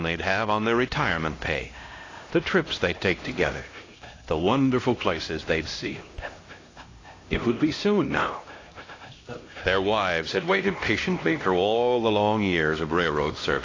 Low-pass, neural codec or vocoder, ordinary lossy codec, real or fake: 7.2 kHz; codec, 16 kHz, 1 kbps, X-Codec, HuBERT features, trained on LibriSpeech; AAC, 32 kbps; fake